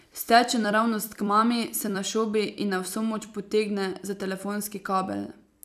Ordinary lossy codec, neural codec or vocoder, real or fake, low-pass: none; vocoder, 44.1 kHz, 128 mel bands every 256 samples, BigVGAN v2; fake; 14.4 kHz